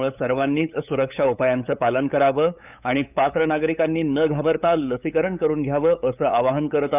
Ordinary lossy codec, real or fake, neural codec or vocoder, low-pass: none; fake; codec, 16 kHz, 8 kbps, FunCodec, trained on LibriTTS, 25 frames a second; 3.6 kHz